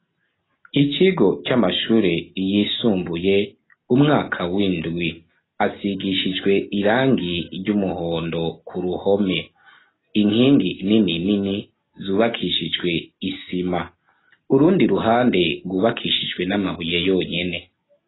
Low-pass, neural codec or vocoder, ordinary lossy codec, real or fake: 7.2 kHz; none; AAC, 16 kbps; real